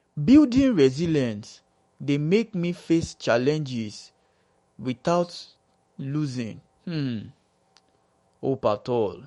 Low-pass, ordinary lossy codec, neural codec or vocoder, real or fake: 19.8 kHz; MP3, 48 kbps; autoencoder, 48 kHz, 128 numbers a frame, DAC-VAE, trained on Japanese speech; fake